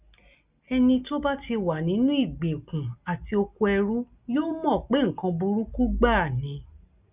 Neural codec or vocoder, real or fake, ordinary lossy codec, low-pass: none; real; Opus, 64 kbps; 3.6 kHz